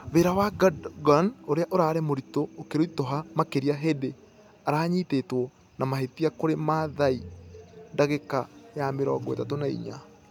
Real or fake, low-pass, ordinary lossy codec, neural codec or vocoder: real; 19.8 kHz; none; none